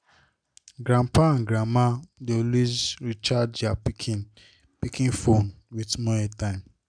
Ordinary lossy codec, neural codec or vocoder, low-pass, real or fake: none; none; 9.9 kHz; real